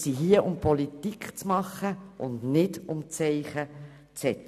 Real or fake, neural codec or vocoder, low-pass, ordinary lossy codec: real; none; 14.4 kHz; none